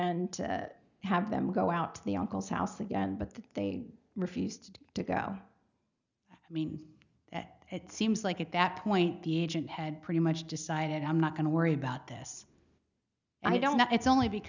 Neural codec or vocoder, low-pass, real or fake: none; 7.2 kHz; real